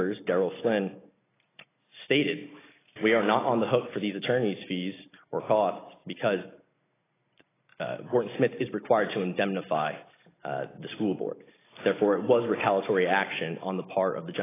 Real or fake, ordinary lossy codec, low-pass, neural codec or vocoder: real; AAC, 16 kbps; 3.6 kHz; none